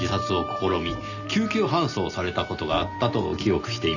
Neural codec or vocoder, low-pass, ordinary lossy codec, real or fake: none; 7.2 kHz; none; real